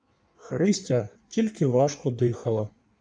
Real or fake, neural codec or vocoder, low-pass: fake; codec, 16 kHz in and 24 kHz out, 1.1 kbps, FireRedTTS-2 codec; 9.9 kHz